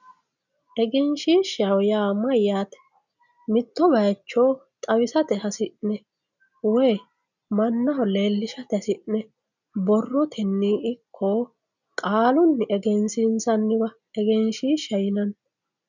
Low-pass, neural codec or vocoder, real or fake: 7.2 kHz; none; real